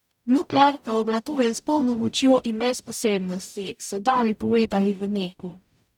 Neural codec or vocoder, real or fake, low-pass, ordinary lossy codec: codec, 44.1 kHz, 0.9 kbps, DAC; fake; 19.8 kHz; none